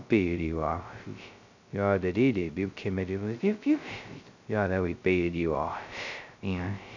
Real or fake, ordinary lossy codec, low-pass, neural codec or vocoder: fake; none; 7.2 kHz; codec, 16 kHz, 0.2 kbps, FocalCodec